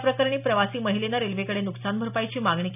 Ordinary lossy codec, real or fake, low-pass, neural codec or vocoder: none; real; 3.6 kHz; none